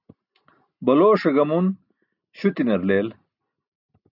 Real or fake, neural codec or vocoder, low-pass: real; none; 5.4 kHz